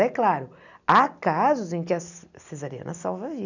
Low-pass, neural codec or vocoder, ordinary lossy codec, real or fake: 7.2 kHz; none; none; real